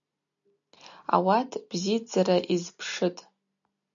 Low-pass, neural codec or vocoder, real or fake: 7.2 kHz; none; real